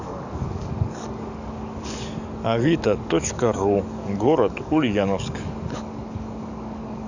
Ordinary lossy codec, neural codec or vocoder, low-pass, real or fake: none; codec, 44.1 kHz, 7.8 kbps, DAC; 7.2 kHz; fake